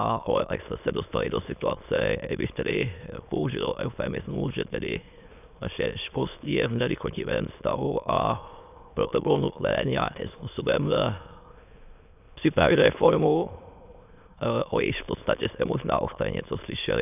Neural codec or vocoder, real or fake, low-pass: autoencoder, 22.05 kHz, a latent of 192 numbers a frame, VITS, trained on many speakers; fake; 3.6 kHz